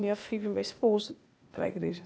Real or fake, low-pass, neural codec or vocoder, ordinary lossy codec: fake; none; codec, 16 kHz, 0.8 kbps, ZipCodec; none